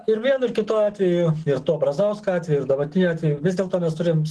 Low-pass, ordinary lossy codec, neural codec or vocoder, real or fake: 10.8 kHz; Opus, 16 kbps; none; real